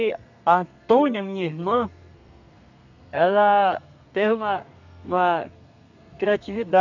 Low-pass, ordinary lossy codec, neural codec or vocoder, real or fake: 7.2 kHz; none; codec, 32 kHz, 1.9 kbps, SNAC; fake